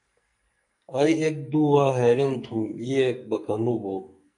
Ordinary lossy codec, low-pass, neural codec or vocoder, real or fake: MP3, 64 kbps; 10.8 kHz; codec, 44.1 kHz, 2.6 kbps, SNAC; fake